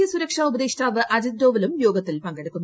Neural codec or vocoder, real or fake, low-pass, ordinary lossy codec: none; real; none; none